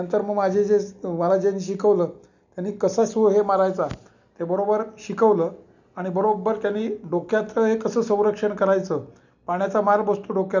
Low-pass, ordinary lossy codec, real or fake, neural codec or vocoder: 7.2 kHz; none; real; none